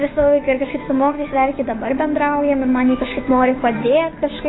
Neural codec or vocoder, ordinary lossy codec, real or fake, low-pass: codec, 16 kHz, 6 kbps, DAC; AAC, 16 kbps; fake; 7.2 kHz